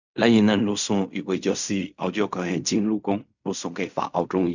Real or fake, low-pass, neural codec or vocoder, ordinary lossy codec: fake; 7.2 kHz; codec, 16 kHz in and 24 kHz out, 0.4 kbps, LongCat-Audio-Codec, fine tuned four codebook decoder; none